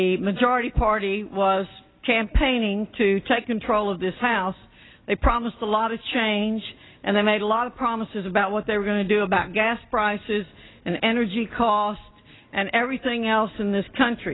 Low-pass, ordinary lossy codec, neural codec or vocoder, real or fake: 7.2 kHz; AAC, 16 kbps; none; real